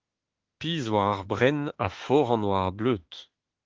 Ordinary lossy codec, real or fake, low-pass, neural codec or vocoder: Opus, 16 kbps; fake; 7.2 kHz; autoencoder, 48 kHz, 32 numbers a frame, DAC-VAE, trained on Japanese speech